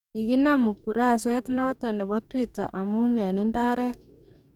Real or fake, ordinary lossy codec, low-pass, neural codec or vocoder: fake; none; 19.8 kHz; codec, 44.1 kHz, 2.6 kbps, DAC